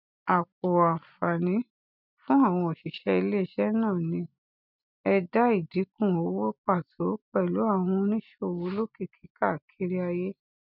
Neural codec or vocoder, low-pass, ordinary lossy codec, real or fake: none; 5.4 kHz; none; real